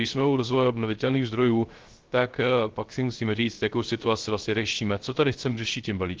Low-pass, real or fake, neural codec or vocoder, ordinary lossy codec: 7.2 kHz; fake; codec, 16 kHz, 0.3 kbps, FocalCodec; Opus, 16 kbps